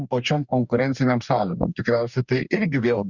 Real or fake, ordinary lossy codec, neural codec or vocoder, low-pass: fake; Opus, 64 kbps; codec, 44.1 kHz, 2.6 kbps, DAC; 7.2 kHz